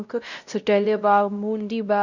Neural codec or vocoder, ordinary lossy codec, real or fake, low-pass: codec, 16 kHz, 0.5 kbps, X-Codec, WavLM features, trained on Multilingual LibriSpeech; none; fake; 7.2 kHz